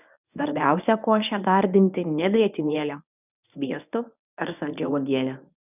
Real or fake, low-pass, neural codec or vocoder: fake; 3.6 kHz; codec, 24 kHz, 0.9 kbps, WavTokenizer, medium speech release version 1